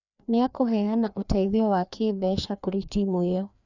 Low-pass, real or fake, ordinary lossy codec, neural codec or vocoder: 7.2 kHz; fake; none; codec, 16 kHz, 2 kbps, FreqCodec, larger model